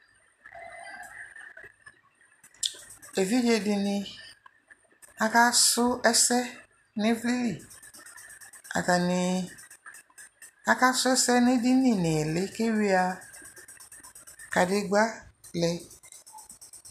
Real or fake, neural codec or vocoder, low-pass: real; none; 14.4 kHz